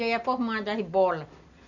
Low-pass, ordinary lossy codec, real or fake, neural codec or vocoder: 7.2 kHz; none; real; none